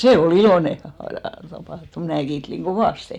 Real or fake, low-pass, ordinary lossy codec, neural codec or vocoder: real; 19.8 kHz; none; none